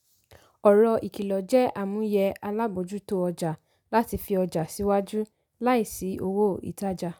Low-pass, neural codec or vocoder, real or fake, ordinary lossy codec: none; none; real; none